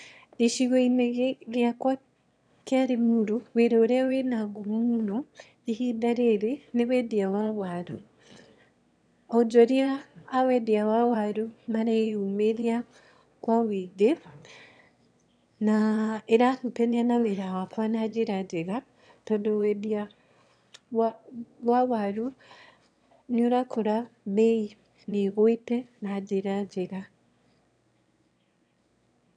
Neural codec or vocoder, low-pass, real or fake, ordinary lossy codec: autoencoder, 22.05 kHz, a latent of 192 numbers a frame, VITS, trained on one speaker; 9.9 kHz; fake; none